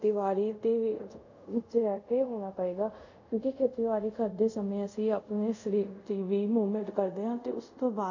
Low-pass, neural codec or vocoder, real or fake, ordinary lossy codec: 7.2 kHz; codec, 24 kHz, 0.5 kbps, DualCodec; fake; none